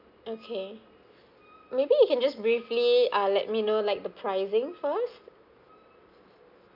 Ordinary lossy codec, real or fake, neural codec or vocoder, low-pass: none; real; none; 5.4 kHz